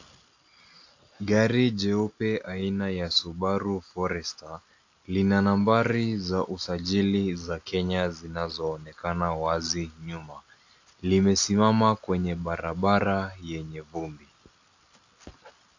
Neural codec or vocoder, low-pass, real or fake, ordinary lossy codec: none; 7.2 kHz; real; AAC, 48 kbps